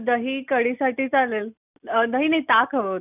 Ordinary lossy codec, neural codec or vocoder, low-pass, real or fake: none; none; 3.6 kHz; real